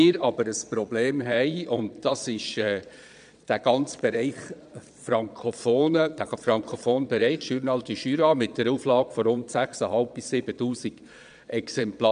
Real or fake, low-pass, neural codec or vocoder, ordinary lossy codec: fake; 9.9 kHz; vocoder, 22.05 kHz, 80 mel bands, Vocos; none